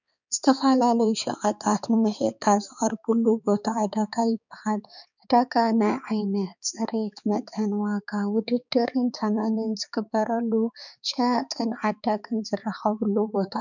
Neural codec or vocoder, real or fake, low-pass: codec, 16 kHz, 4 kbps, X-Codec, HuBERT features, trained on balanced general audio; fake; 7.2 kHz